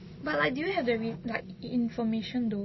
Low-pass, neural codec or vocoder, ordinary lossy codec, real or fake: 7.2 kHz; none; MP3, 24 kbps; real